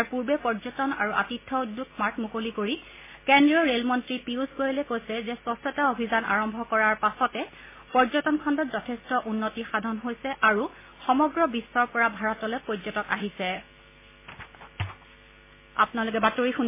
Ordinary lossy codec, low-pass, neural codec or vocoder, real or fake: MP3, 16 kbps; 3.6 kHz; none; real